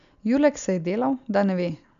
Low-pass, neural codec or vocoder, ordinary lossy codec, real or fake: 7.2 kHz; none; none; real